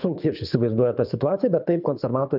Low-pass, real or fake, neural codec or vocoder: 5.4 kHz; fake; codec, 24 kHz, 6 kbps, HILCodec